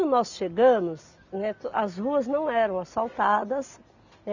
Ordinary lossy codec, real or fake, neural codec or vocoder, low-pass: none; real; none; 7.2 kHz